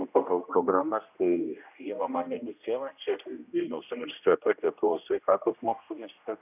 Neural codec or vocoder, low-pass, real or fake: codec, 16 kHz, 1 kbps, X-Codec, HuBERT features, trained on general audio; 3.6 kHz; fake